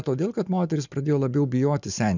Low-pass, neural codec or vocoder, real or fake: 7.2 kHz; none; real